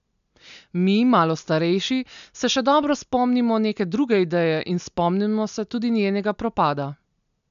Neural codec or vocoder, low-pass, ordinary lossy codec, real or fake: none; 7.2 kHz; none; real